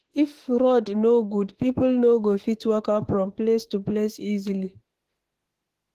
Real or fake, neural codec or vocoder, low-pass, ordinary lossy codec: fake; autoencoder, 48 kHz, 32 numbers a frame, DAC-VAE, trained on Japanese speech; 14.4 kHz; Opus, 16 kbps